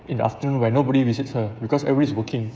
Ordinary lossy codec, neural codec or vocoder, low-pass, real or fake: none; codec, 16 kHz, 16 kbps, FreqCodec, smaller model; none; fake